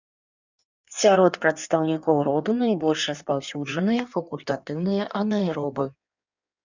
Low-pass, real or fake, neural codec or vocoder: 7.2 kHz; fake; codec, 16 kHz in and 24 kHz out, 1.1 kbps, FireRedTTS-2 codec